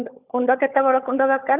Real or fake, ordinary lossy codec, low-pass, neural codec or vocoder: fake; none; 3.6 kHz; codec, 16 kHz, 16 kbps, FunCodec, trained on LibriTTS, 50 frames a second